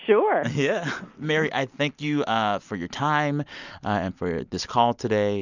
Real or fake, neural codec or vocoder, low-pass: real; none; 7.2 kHz